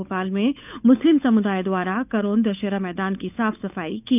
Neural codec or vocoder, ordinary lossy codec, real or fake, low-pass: codec, 16 kHz, 8 kbps, FunCodec, trained on Chinese and English, 25 frames a second; none; fake; 3.6 kHz